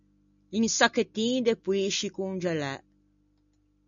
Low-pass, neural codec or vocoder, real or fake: 7.2 kHz; none; real